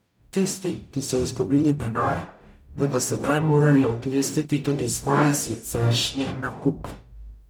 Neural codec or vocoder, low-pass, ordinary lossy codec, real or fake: codec, 44.1 kHz, 0.9 kbps, DAC; none; none; fake